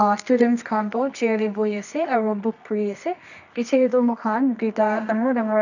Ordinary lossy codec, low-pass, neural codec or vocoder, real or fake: none; 7.2 kHz; codec, 24 kHz, 0.9 kbps, WavTokenizer, medium music audio release; fake